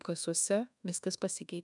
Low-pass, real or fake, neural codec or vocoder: 10.8 kHz; fake; codec, 24 kHz, 1.2 kbps, DualCodec